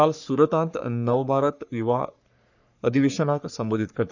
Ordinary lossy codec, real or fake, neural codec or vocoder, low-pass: none; fake; codec, 44.1 kHz, 3.4 kbps, Pupu-Codec; 7.2 kHz